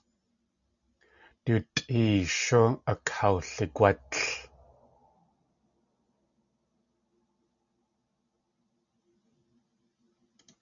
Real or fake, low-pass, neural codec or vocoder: real; 7.2 kHz; none